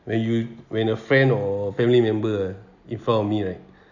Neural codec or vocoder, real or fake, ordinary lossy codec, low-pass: none; real; none; 7.2 kHz